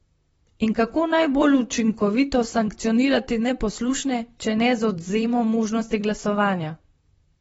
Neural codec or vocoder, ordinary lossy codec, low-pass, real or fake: vocoder, 44.1 kHz, 128 mel bands, Pupu-Vocoder; AAC, 24 kbps; 19.8 kHz; fake